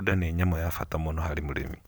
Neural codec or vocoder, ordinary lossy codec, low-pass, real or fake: none; none; none; real